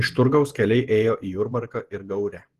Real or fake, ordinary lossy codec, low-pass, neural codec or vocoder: fake; Opus, 16 kbps; 14.4 kHz; vocoder, 48 kHz, 128 mel bands, Vocos